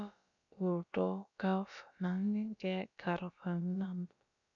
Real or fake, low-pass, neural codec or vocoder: fake; 7.2 kHz; codec, 16 kHz, about 1 kbps, DyCAST, with the encoder's durations